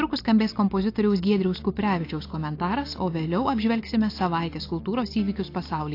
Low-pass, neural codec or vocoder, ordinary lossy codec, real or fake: 5.4 kHz; none; AAC, 32 kbps; real